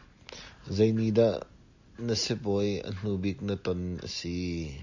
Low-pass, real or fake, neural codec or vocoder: 7.2 kHz; real; none